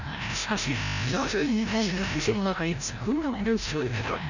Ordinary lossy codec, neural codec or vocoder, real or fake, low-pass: none; codec, 16 kHz, 0.5 kbps, FreqCodec, larger model; fake; 7.2 kHz